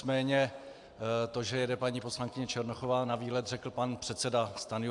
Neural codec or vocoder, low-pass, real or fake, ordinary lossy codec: none; 10.8 kHz; real; AAC, 64 kbps